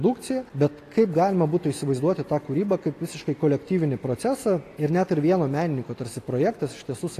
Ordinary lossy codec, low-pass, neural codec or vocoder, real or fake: AAC, 48 kbps; 14.4 kHz; none; real